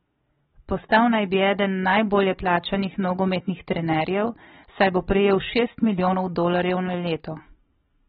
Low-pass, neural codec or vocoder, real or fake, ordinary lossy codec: 19.8 kHz; vocoder, 44.1 kHz, 128 mel bands every 512 samples, BigVGAN v2; fake; AAC, 16 kbps